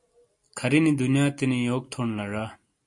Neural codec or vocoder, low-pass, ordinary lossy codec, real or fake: none; 10.8 kHz; MP3, 48 kbps; real